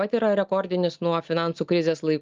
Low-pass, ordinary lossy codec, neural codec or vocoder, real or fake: 7.2 kHz; Opus, 32 kbps; none; real